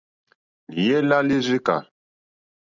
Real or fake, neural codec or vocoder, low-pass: real; none; 7.2 kHz